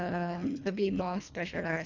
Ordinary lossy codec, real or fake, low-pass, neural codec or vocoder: none; fake; 7.2 kHz; codec, 24 kHz, 1.5 kbps, HILCodec